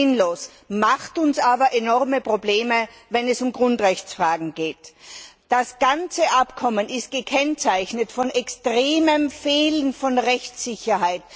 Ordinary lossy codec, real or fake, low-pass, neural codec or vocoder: none; real; none; none